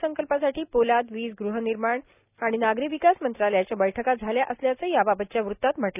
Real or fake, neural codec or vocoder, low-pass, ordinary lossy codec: real; none; 3.6 kHz; none